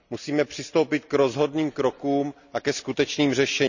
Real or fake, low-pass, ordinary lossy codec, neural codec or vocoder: real; 7.2 kHz; none; none